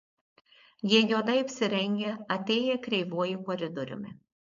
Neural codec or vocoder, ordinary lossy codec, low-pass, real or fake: codec, 16 kHz, 4.8 kbps, FACodec; MP3, 64 kbps; 7.2 kHz; fake